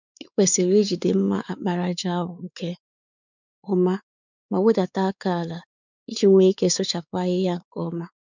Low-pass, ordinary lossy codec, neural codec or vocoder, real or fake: 7.2 kHz; none; codec, 16 kHz, 4 kbps, X-Codec, WavLM features, trained on Multilingual LibriSpeech; fake